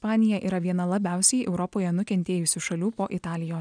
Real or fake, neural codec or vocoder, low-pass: real; none; 9.9 kHz